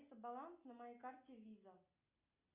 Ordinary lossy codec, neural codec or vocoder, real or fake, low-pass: Opus, 64 kbps; none; real; 3.6 kHz